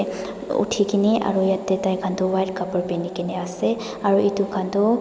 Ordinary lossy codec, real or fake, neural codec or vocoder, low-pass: none; real; none; none